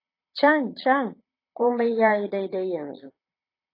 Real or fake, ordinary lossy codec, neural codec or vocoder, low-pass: fake; AAC, 32 kbps; vocoder, 22.05 kHz, 80 mel bands, Vocos; 5.4 kHz